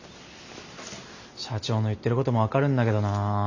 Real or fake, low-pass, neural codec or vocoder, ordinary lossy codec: real; 7.2 kHz; none; none